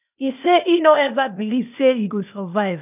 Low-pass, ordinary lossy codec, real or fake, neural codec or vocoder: 3.6 kHz; none; fake; codec, 16 kHz, 0.8 kbps, ZipCodec